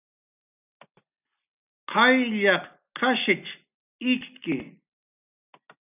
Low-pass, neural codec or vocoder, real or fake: 3.6 kHz; none; real